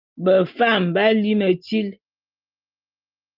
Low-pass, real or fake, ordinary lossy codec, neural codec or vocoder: 5.4 kHz; real; Opus, 32 kbps; none